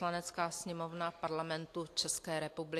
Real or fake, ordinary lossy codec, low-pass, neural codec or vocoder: real; AAC, 64 kbps; 14.4 kHz; none